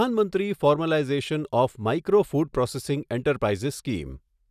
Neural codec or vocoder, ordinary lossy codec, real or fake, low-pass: none; none; real; 14.4 kHz